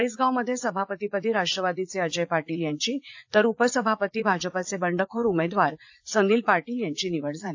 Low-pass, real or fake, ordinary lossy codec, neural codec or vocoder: 7.2 kHz; fake; AAC, 48 kbps; vocoder, 44.1 kHz, 80 mel bands, Vocos